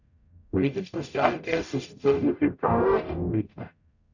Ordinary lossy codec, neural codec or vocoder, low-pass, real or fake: none; codec, 44.1 kHz, 0.9 kbps, DAC; 7.2 kHz; fake